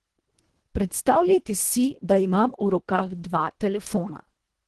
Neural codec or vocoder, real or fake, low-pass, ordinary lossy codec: codec, 24 kHz, 1.5 kbps, HILCodec; fake; 10.8 kHz; Opus, 16 kbps